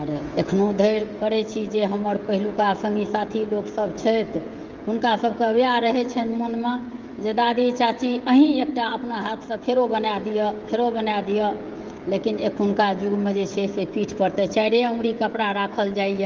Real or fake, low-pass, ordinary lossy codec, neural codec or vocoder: fake; 7.2 kHz; Opus, 32 kbps; codec, 16 kHz, 16 kbps, FreqCodec, smaller model